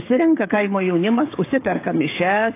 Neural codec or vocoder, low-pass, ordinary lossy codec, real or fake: codec, 16 kHz, 16 kbps, FreqCodec, smaller model; 3.6 kHz; AAC, 24 kbps; fake